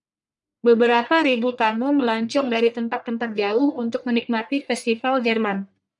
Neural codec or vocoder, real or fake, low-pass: codec, 44.1 kHz, 1.7 kbps, Pupu-Codec; fake; 10.8 kHz